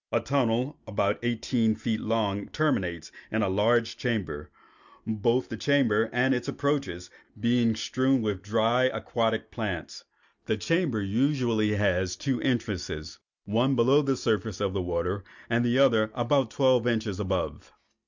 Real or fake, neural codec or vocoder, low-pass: real; none; 7.2 kHz